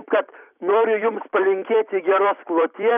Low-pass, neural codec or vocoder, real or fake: 3.6 kHz; vocoder, 44.1 kHz, 128 mel bands every 256 samples, BigVGAN v2; fake